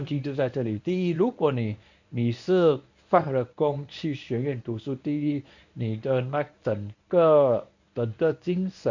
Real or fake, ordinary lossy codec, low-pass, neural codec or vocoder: fake; none; 7.2 kHz; codec, 24 kHz, 0.9 kbps, WavTokenizer, medium speech release version 1